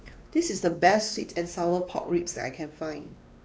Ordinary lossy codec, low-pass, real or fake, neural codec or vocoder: none; none; fake; codec, 16 kHz, 2 kbps, X-Codec, WavLM features, trained on Multilingual LibriSpeech